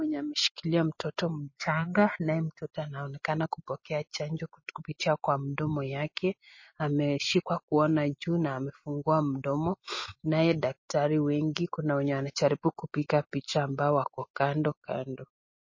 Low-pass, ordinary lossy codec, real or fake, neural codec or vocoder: 7.2 kHz; MP3, 32 kbps; real; none